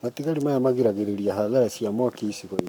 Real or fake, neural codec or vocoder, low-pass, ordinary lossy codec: fake; codec, 44.1 kHz, 7.8 kbps, Pupu-Codec; 19.8 kHz; none